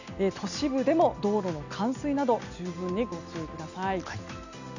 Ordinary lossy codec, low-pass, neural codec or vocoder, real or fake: none; 7.2 kHz; none; real